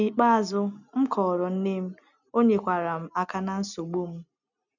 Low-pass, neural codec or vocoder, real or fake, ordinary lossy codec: 7.2 kHz; none; real; none